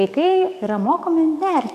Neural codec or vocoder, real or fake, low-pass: codec, 44.1 kHz, 7.8 kbps, DAC; fake; 14.4 kHz